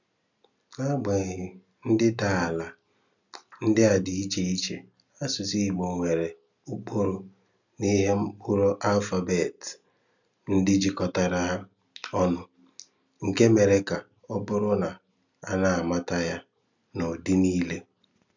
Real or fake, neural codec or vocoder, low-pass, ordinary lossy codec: real; none; 7.2 kHz; none